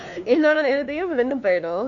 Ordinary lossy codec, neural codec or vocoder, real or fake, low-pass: none; codec, 16 kHz, 2 kbps, X-Codec, WavLM features, trained on Multilingual LibriSpeech; fake; 7.2 kHz